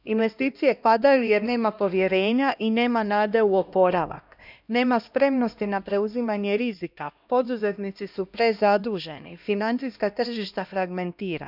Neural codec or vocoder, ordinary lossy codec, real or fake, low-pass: codec, 16 kHz, 1 kbps, X-Codec, HuBERT features, trained on LibriSpeech; none; fake; 5.4 kHz